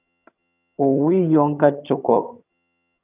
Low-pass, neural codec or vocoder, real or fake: 3.6 kHz; vocoder, 22.05 kHz, 80 mel bands, HiFi-GAN; fake